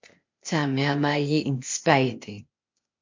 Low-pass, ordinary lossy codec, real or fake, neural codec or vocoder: 7.2 kHz; MP3, 48 kbps; fake; codec, 16 kHz, 0.8 kbps, ZipCodec